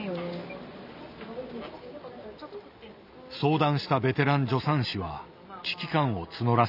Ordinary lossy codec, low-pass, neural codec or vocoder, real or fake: none; 5.4 kHz; none; real